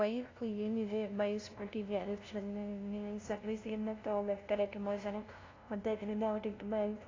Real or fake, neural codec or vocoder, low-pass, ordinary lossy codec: fake; codec, 16 kHz, 0.5 kbps, FunCodec, trained on LibriTTS, 25 frames a second; 7.2 kHz; AAC, 32 kbps